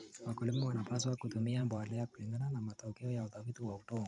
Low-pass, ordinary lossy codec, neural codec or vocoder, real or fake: 10.8 kHz; none; vocoder, 44.1 kHz, 128 mel bands every 512 samples, BigVGAN v2; fake